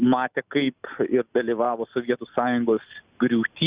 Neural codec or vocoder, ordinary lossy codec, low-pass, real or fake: none; Opus, 64 kbps; 3.6 kHz; real